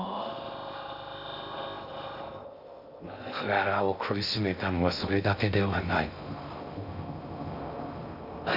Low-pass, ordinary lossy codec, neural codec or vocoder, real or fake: 5.4 kHz; none; codec, 16 kHz in and 24 kHz out, 0.6 kbps, FocalCodec, streaming, 2048 codes; fake